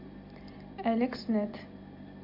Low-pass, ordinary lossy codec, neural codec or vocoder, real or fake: 5.4 kHz; MP3, 48 kbps; none; real